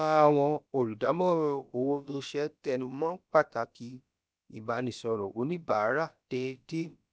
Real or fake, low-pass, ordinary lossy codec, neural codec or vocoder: fake; none; none; codec, 16 kHz, about 1 kbps, DyCAST, with the encoder's durations